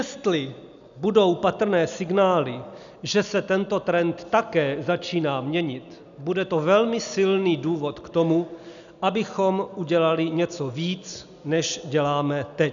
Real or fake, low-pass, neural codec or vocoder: real; 7.2 kHz; none